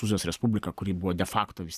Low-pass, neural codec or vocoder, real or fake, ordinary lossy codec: 14.4 kHz; vocoder, 44.1 kHz, 128 mel bands every 512 samples, BigVGAN v2; fake; Opus, 64 kbps